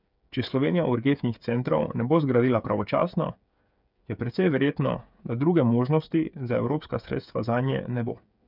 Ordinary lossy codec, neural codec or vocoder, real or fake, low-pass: none; codec, 16 kHz, 8 kbps, FreqCodec, smaller model; fake; 5.4 kHz